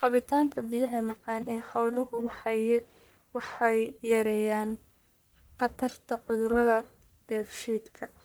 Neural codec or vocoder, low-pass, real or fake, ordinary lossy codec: codec, 44.1 kHz, 1.7 kbps, Pupu-Codec; none; fake; none